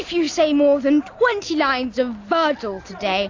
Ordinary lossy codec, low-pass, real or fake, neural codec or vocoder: AAC, 48 kbps; 7.2 kHz; real; none